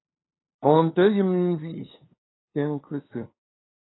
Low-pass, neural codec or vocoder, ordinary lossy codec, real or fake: 7.2 kHz; codec, 16 kHz, 2 kbps, FunCodec, trained on LibriTTS, 25 frames a second; AAC, 16 kbps; fake